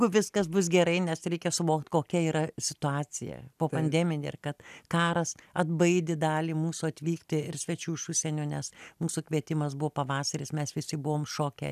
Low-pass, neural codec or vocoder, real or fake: 14.4 kHz; none; real